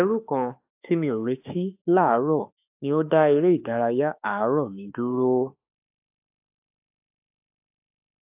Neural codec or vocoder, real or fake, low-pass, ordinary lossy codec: autoencoder, 48 kHz, 32 numbers a frame, DAC-VAE, trained on Japanese speech; fake; 3.6 kHz; AAC, 32 kbps